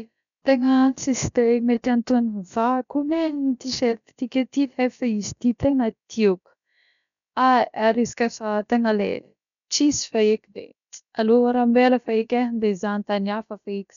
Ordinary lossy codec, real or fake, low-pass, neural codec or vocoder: none; fake; 7.2 kHz; codec, 16 kHz, about 1 kbps, DyCAST, with the encoder's durations